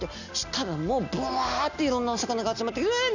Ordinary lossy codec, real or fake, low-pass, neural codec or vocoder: none; real; 7.2 kHz; none